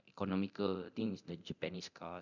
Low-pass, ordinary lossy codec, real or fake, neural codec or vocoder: 7.2 kHz; none; fake; codec, 24 kHz, 0.9 kbps, DualCodec